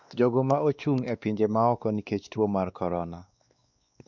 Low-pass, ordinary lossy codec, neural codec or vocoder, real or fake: 7.2 kHz; none; codec, 16 kHz, 2 kbps, X-Codec, WavLM features, trained on Multilingual LibriSpeech; fake